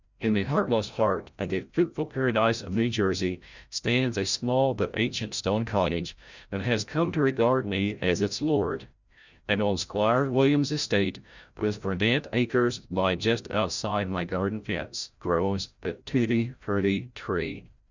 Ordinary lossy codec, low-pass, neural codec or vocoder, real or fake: Opus, 64 kbps; 7.2 kHz; codec, 16 kHz, 0.5 kbps, FreqCodec, larger model; fake